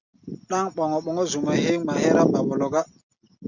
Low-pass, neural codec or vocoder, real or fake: 7.2 kHz; none; real